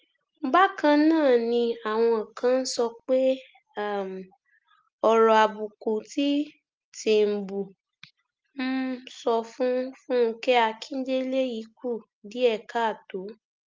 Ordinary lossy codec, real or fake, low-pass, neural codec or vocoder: Opus, 24 kbps; real; 7.2 kHz; none